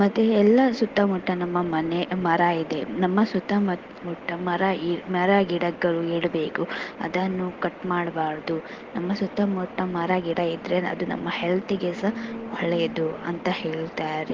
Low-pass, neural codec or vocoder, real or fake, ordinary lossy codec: 7.2 kHz; none; real; Opus, 16 kbps